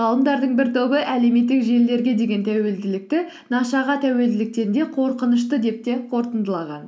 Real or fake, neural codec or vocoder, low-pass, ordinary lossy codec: real; none; none; none